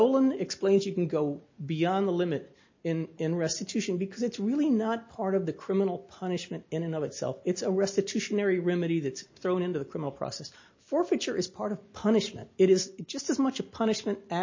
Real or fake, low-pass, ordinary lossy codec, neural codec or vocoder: real; 7.2 kHz; MP3, 32 kbps; none